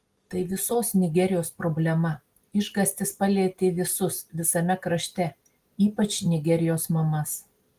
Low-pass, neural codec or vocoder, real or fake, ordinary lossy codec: 14.4 kHz; none; real; Opus, 24 kbps